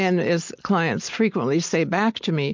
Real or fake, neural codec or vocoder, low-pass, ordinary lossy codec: real; none; 7.2 kHz; MP3, 48 kbps